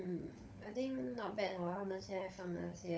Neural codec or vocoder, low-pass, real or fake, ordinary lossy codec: codec, 16 kHz, 16 kbps, FunCodec, trained on LibriTTS, 50 frames a second; none; fake; none